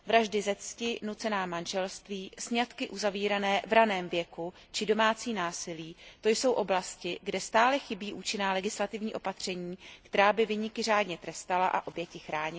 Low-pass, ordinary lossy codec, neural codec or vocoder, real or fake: none; none; none; real